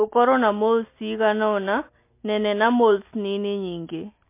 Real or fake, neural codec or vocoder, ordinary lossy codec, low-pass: real; none; MP3, 24 kbps; 3.6 kHz